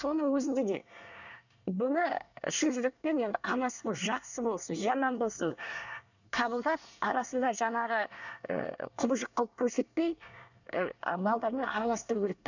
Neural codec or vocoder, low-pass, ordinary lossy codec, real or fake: codec, 24 kHz, 1 kbps, SNAC; 7.2 kHz; none; fake